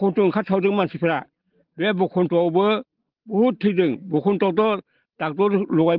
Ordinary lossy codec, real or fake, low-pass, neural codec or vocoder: Opus, 16 kbps; real; 5.4 kHz; none